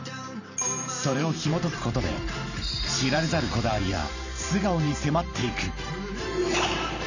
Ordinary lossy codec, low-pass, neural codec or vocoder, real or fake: none; 7.2 kHz; vocoder, 44.1 kHz, 128 mel bands every 512 samples, BigVGAN v2; fake